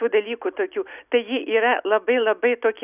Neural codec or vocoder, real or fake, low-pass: none; real; 3.6 kHz